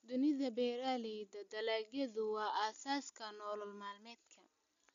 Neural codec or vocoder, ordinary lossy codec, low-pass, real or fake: none; none; 7.2 kHz; real